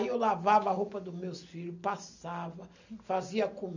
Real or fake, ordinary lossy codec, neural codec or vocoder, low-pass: real; none; none; 7.2 kHz